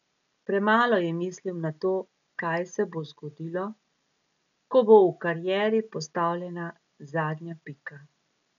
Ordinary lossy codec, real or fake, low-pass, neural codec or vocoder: none; real; 7.2 kHz; none